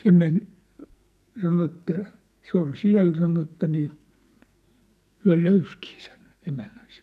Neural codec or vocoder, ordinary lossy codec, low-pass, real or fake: codec, 44.1 kHz, 2.6 kbps, SNAC; none; 14.4 kHz; fake